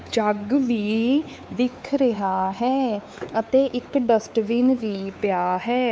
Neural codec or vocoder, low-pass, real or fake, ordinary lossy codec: codec, 16 kHz, 4 kbps, X-Codec, WavLM features, trained on Multilingual LibriSpeech; none; fake; none